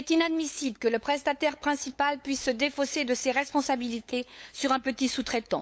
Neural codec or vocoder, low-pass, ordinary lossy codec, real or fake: codec, 16 kHz, 8 kbps, FunCodec, trained on LibriTTS, 25 frames a second; none; none; fake